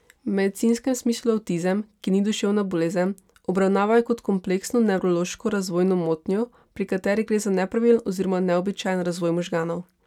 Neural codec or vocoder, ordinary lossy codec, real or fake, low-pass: none; none; real; 19.8 kHz